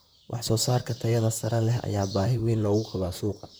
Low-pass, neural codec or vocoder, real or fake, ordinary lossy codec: none; vocoder, 44.1 kHz, 128 mel bands, Pupu-Vocoder; fake; none